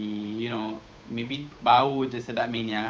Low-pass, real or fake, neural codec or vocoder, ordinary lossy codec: none; fake; codec, 16 kHz, 8 kbps, FunCodec, trained on Chinese and English, 25 frames a second; none